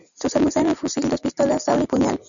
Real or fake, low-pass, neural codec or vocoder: real; 7.2 kHz; none